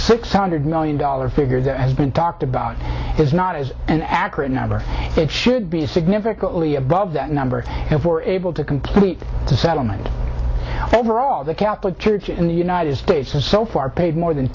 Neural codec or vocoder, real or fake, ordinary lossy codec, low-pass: none; real; AAC, 32 kbps; 7.2 kHz